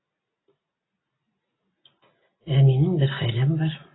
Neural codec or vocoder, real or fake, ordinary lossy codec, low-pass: none; real; AAC, 16 kbps; 7.2 kHz